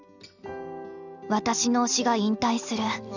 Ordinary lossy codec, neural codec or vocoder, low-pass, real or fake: none; none; 7.2 kHz; real